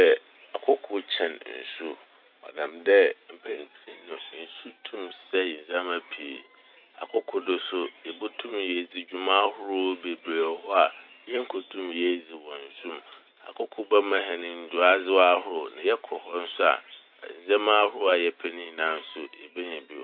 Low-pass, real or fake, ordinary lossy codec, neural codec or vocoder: 5.4 kHz; real; none; none